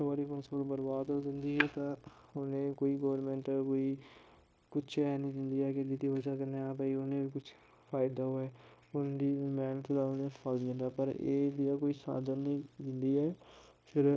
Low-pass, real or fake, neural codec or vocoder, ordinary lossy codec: none; fake; codec, 16 kHz, 0.9 kbps, LongCat-Audio-Codec; none